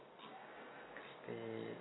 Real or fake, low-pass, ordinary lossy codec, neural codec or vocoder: real; 7.2 kHz; AAC, 16 kbps; none